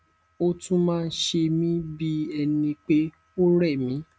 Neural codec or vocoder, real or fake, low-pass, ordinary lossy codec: none; real; none; none